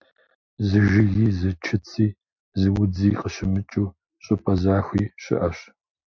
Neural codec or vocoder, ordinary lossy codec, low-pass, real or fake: none; MP3, 48 kbps; 7.2 kHz; real